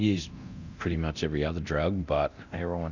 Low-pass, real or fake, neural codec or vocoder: 7.2 kHz; fake; codec, 24 kHz, 0.9 kbps, DualCodec